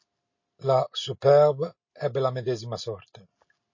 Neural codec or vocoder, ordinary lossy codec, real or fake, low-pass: none; MP3, 32 kbps; real; 7.2 kHz